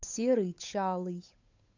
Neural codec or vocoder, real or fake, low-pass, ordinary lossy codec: codec, 16 kHz, 8 kbps, FunCodec, trained on Chinese and English, 25 frames a second; fake; 7.2 kHz; AAC, 48 kbps